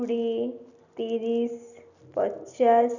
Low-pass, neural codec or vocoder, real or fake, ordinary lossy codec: 7.2 kHz; none; real; none